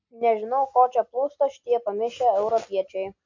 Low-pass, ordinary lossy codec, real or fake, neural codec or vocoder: 7.2 kHz; MP3, 48 kbps; real; none